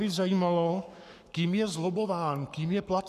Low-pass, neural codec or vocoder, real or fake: 14.4 kHz; codec, 44.1 kHz, 7.8 kbps, Pupu-Codec; fake